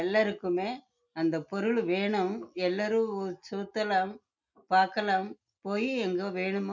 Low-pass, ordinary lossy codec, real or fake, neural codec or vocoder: 7.2 kHz; none; real; none